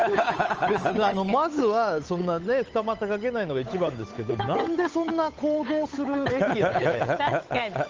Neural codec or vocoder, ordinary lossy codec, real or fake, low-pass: codec, 16 kHz, 8 kbps, FunCodec, trained on Chinese and English, 25 frames a second; Opus, 24 kbps; fake; 7.2 kHz